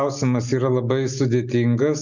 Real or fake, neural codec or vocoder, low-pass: real; none; 7.2 kHz